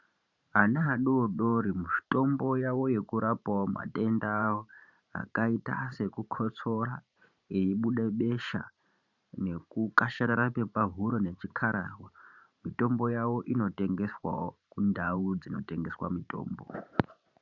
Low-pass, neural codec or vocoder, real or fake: 7.2 kHz; vocoder, 44.1 kHz, 128 mel bands every 512 samples, BigVGAN v2; fake